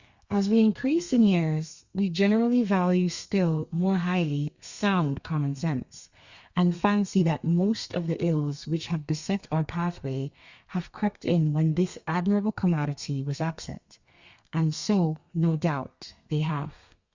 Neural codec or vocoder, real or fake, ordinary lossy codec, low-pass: codec, 32 kHz, 1.9 kbps, SNAC; fake; Opus, 64 kbps; 7.2 kHz